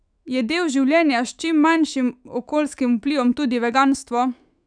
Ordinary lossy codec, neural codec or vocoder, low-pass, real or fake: none; autoencoder, 48 kHz, 128 numbers a frame, DAC-VAE, trained on Japanese speech; 9.9 kHz; fake